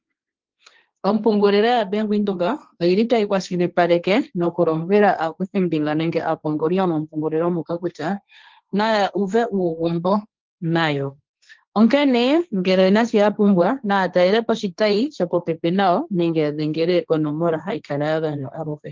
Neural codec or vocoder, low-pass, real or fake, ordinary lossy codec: codec, 16 kHz, 1.1 kbps, Voila-Tokenizer; 7.2 kHz; fake; Opus, 32 kbps